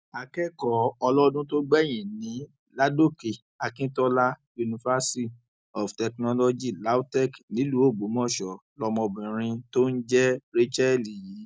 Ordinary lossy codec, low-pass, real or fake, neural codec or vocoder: none; none; real; none